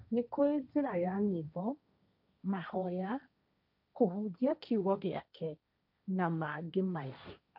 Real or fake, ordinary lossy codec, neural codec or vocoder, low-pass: fake; none; codec, 16 kHz, 1.1 kbps, Voila-Tokenizer; 5.4 kHz